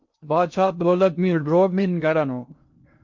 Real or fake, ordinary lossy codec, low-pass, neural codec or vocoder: fake; MP3, 48 kbps; 7.2 kHz; codec, 16 kHz in and 24 kHz out, 0.6 kbps, FocalCodec, streaming, 2048 codes